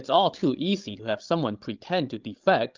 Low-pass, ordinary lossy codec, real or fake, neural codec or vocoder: 7.2 kHz; Opus, 24 kbps; fake; codec, 16 kHz, 16 kbps, FreqCodec, larger model